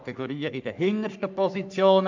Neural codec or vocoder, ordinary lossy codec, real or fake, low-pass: codec, 44.1 kHz, 3.4 kbps, Pupu-Codec; MP3, 64 kbps; fake; 7.2 kHz